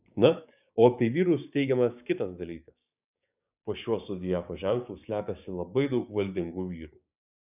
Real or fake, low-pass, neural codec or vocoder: fake; 3.6 kHz; codec, 16 kHz, 6 kbps, DAC